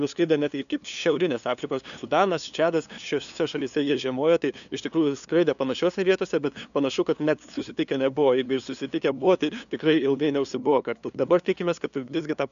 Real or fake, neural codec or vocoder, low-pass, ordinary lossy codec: fake; codec, 16 kHz, 2 kbps, FunCodec, trained on LibriTTS, 25 frames a second; 7.2 kHz; MP3, 96 kbps